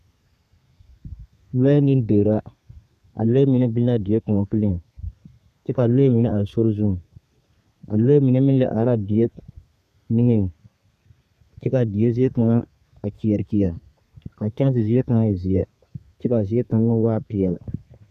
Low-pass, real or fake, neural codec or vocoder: 14.4 kHz; fake; codec, 32 kHz, 1.9 kbps, SNAC